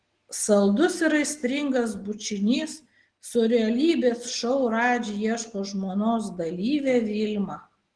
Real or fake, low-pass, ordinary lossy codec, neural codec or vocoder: real; 9.9 kHz; Opus, 16 kbps; none